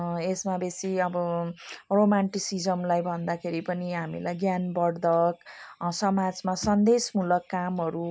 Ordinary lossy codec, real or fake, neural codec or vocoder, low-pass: none; real; none; none